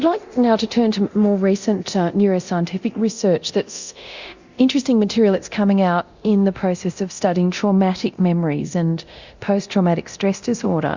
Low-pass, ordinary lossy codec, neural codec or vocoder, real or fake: 7.2 kHz; Opus, 64 kbps; codec, 24 kHz, 0.9 kbps, DualCodec; fake